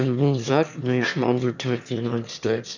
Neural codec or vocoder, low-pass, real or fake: autoencoder, 22.05 kHz, a latent of 192 numbers a frame, VITS, trained on one speaker; 7.2 kHz; fake